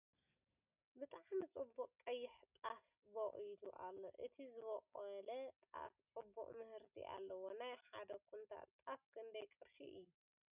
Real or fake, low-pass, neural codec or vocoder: real; 3.6 kHz; none